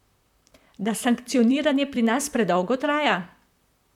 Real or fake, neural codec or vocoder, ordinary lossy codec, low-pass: fake; vocoder, 44.1 kHz, 128 mel bands, Pupu-Vocoder; none; 19.8 kHz